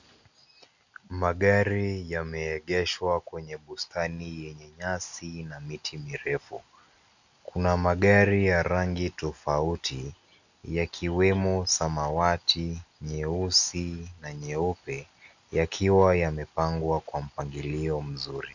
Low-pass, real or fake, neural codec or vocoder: 7.2 kHz; real; none